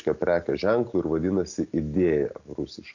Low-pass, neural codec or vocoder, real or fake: 7.2 kHz; none; real